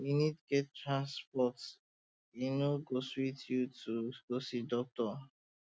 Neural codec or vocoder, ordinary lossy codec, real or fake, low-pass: none; none; real; none